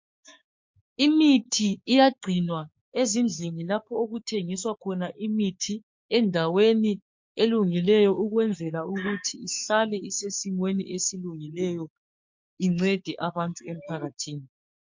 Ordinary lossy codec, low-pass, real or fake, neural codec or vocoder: MP3, 48 kbps; 7.2 kHz; fake; codec, 16 kHz, 6 kbps, DAC